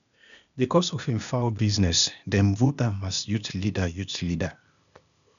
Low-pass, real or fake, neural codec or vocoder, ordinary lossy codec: 7.2 kHz; fake; codec, 16 kHz, 0.8 kbps, ZipCodec; none